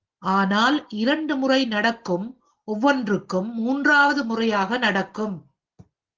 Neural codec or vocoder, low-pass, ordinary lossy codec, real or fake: none; 7.2 kHz; Opus, 16 kbps; real